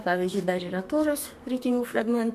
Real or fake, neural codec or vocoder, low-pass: fake; codec, 32 kHz, 1.9 kbps, SNAC; 14.4 kHz